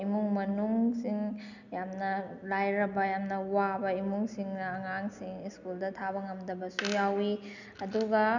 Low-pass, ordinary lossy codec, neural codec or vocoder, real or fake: 7.2 kHz; none; none; real